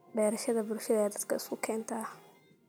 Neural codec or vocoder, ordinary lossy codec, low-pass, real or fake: none; none; none; real